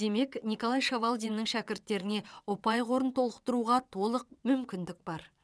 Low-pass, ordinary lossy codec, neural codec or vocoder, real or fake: none; none; vocoder, 22.05 kHz, 80 mel bands, WaveNeXt; fake